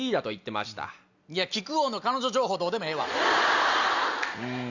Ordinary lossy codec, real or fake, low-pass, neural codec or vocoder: Opus, 64 kbps; real; 7.2 kHz; none